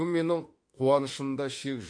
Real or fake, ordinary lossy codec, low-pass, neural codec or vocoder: fake; MP3, 48 kbps; 9.9 kHz; autoencoder, 48 kHz, 32 numbers a frame, DAC-VAE, trained on Japanese speech